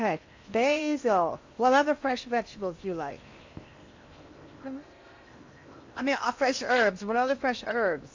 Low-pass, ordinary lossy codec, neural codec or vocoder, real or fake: 7.2 kHz; AAC, 48 kbps; codec, 16 kHz in and 24 kHz out, 0.8 kbps, FocalCodec, streaming, 65536 codes; fake